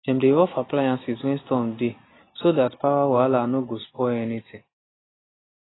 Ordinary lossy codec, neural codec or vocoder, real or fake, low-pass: AAC, 16 kbps; none; real; 7.2 kHz